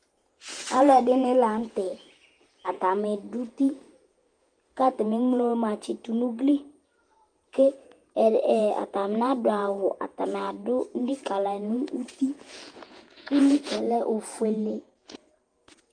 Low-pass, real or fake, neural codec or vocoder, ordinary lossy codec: 9.9 kHz; fake; vocoder, 48 kHz, 128 mel bands, Vocos; Opus, 32 kbps